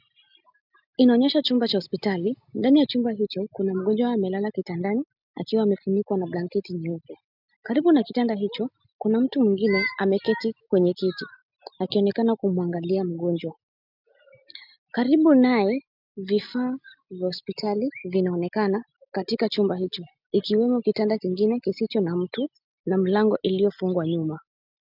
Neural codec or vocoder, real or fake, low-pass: none; real; 5.4 kHz